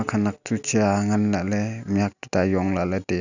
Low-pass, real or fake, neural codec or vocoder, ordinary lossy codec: 7.2 kHz; real; none; none